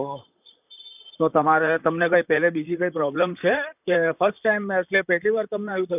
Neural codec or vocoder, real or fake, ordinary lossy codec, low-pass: vocoder, 44.1 kHz, 128 mel bands, Pupu-Vocoder; fake; none; 3.6 kHz